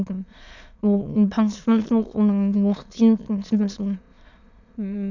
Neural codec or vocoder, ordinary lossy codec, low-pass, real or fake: autoencoder, 22.05 kHz, a latent of 192 numbers a frame, VITS, trained on many speakers; none; 7.2 kHz; fake